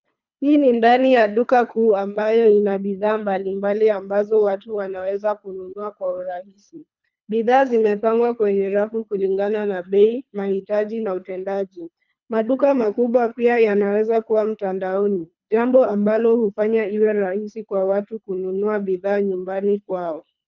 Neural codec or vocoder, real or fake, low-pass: codec, 24 kHz, 3 kbps, HILCodec; fake; 7.2 kHz